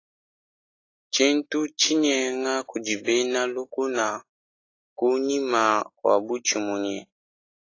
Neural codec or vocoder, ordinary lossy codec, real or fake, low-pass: none; AAC, 32 kbps; real; 7.2 kHz